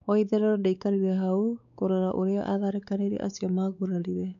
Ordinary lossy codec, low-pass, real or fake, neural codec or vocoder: none; 7.2 kHz; fake; codec, 16 kHz, 4 kbps, X-Codec, WavLM features, trained on Multilingual LibriSpeech